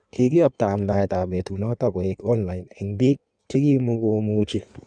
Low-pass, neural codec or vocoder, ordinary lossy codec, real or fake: 9.9 kHz; codec, 16 kHz in and 24 kHz out, 1.1 kbps, FireRedTTS-2 codec; none; fake